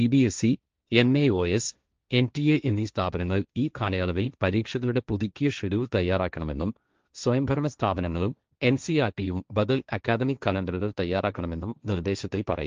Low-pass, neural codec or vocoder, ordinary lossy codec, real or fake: 7.2 kHz; codec, 16 kHz, 1.1 kbps, Voila-Tokenizer; Opus, 24 kbps; fake